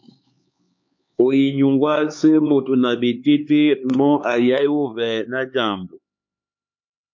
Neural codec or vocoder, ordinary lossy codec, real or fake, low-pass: codec, 16 kHz, 4 kbps, X-Codec, HuBERT features, trained on LibriSpeech; MP3, 48 kbps; fake; 7.2 kHz